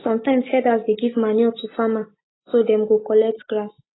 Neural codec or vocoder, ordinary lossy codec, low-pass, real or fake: none; AAC, 16 kbps; 7.2 kHz; real